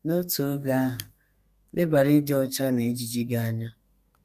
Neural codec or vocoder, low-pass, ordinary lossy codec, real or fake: codec, 32 kHz, 1.9 kbps, SNAC; 14.4 kHz; MP3, 96 kbps; fake